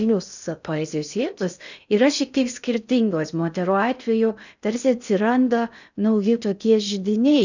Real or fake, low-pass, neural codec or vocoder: fake; 7.2 kHz; codec, 16 kHz in and 24 kHz out, 0.6 kbps, FocalCodec, streaming, 2048 codes